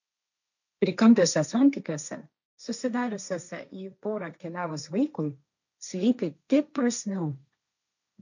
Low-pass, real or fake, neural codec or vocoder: 7.2 kHz; fake; codec, 16 kHz, 1.1 kbps, Voila-Tokenizer